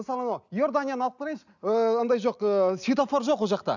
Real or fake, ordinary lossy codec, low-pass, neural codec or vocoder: real; none; 7.2 kHz; none